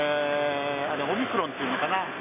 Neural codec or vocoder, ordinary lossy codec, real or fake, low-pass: none; none; real; 3.6 kHz